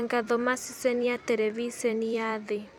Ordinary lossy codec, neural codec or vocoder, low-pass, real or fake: none; vocoder, 44.1 kHz, 128 mel bands every 256 samples, BigVGAN v2; 19.8 kHz; fake